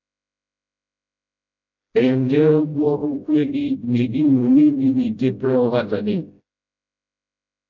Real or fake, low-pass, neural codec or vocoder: fake; 7.2 kHz; codec, 16 kHz, 0.5 kbps, FreqCodec, smaller model